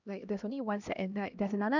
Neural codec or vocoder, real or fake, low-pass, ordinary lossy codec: codec, 16 kHz, 1 kbps, X-Codec, HuBERT features, trained on LibriSpeech; fake; 7.2 kHz; none